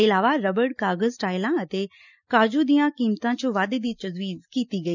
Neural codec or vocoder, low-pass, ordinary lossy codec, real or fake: none; 7.2 kHz; none; real